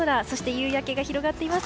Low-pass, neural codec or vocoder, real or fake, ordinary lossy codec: none; none; real; none